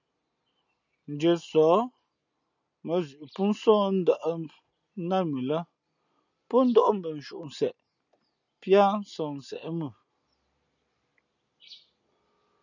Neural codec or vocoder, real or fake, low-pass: none; real; 7.2 kHz